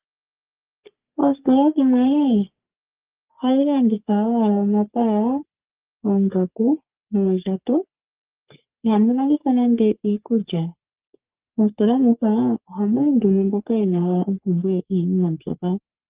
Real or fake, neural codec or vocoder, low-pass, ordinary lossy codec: fake; codec, 44.1 kHz, 3.4 kbps, Pupu-Codec; 3.6 kHz; Opus, 32 kbps